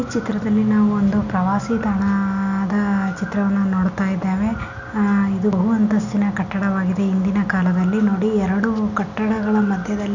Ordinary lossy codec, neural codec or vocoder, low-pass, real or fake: none; none; 7.2 kHz; real